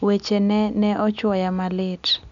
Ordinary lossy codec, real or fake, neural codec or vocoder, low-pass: none; real; none; 7.2 kHz